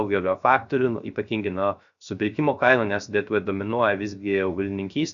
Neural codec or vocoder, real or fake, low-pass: codec, 16 kHz, 0.3 kbps, FocalCodec; fake; 7.2 kHz